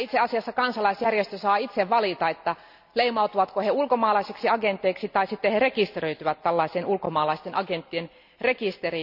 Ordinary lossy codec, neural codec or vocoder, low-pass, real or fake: none; none; 5.4 kHz; real